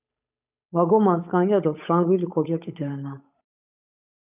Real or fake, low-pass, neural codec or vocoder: fake; 3.6 kHz; codec, 16 kHz, 8 kbps, FunCodec, trained on Chinese and English, 25 frames a second